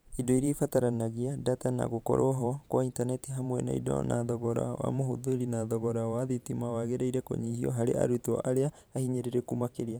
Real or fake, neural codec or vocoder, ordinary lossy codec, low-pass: fake; vocoder, 44.1 kHz, 128 mel bands every 512 samples, BigVGAN v2; none; none